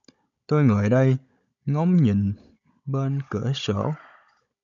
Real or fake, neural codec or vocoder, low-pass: fake; codec, 16 kHz, 16 kbps, FunCodec, trained on Chinese and English, 50 frames a second; 7.2 kHz